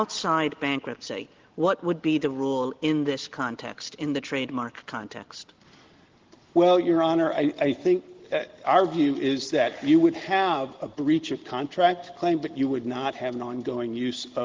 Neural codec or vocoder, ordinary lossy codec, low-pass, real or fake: none; Opus, 16 kbps; 7.2 kHz; real